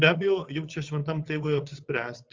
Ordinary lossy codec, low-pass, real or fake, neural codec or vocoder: Opus, 32 kbps; 7.2 kHz; fake; vocoder, 22.05 kHz, 80 mel bands, WaveNeXt